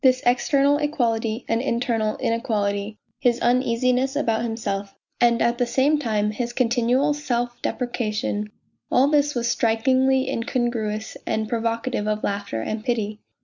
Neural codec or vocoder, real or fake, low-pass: none; real; 7.2 kHz